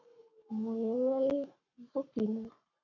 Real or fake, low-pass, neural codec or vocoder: fake; 7.2 kHz; codec, 44.1 kHz, 7.8 kbps, Pupu-Codec